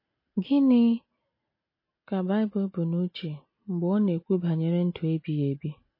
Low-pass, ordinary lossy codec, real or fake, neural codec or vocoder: 5.4 kHz; MP3, 24 kbps; real; none